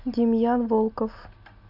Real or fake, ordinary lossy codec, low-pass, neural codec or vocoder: real; none; 5.4 kHz; none